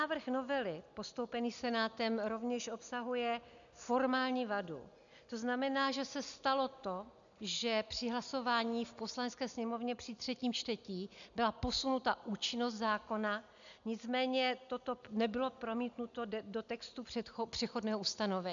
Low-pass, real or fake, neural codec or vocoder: 7.2 kHz; real; none